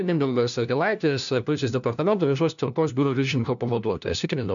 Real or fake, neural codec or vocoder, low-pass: fake; codec, 16 kHz, 1 kbps, FunCodec, trained on LibriTTS, 50 frames a second; 7.2 kHz